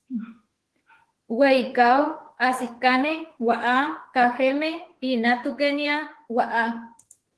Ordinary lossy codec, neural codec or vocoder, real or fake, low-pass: Opus, 16 kbps; autoencoder, 48 kHz, 32 numbers a frame, DAC-VAE, trained on Japanese speech; fake; 10.8 kHz